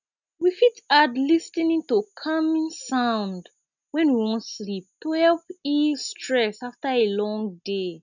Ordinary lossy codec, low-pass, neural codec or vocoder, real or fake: none; 7.2 kHz; none; real